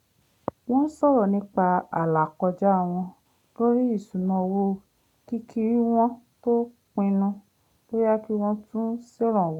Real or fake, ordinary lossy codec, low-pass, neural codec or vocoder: real; none; 19.8 kHz; none